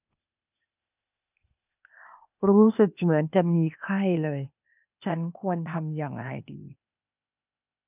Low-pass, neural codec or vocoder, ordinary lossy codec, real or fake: 3.6 kHz; codec, 16 kHz, 0.8 kbps, ZipCodec; none; fake